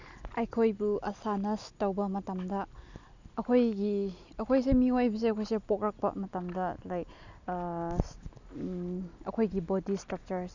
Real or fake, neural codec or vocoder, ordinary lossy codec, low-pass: real; none; none; 7.2 kHz